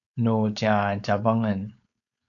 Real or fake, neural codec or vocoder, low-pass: fake; codec, 16 kHz, 4.8 kbps, FACodec; 7.2 kHz